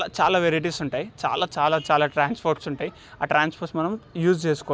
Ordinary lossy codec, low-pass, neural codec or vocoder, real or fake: none; none; none; real